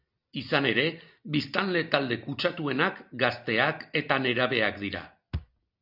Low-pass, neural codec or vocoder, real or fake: 5.4 kHz; none; real